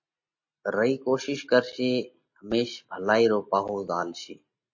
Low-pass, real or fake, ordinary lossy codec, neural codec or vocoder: 7.2 kHz; real; MP3, 32 kbps; none